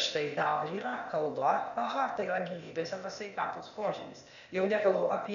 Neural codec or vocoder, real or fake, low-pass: codec, 16 kHz, 0.8 kbps, ZipCodec; fake; 7.2 kHz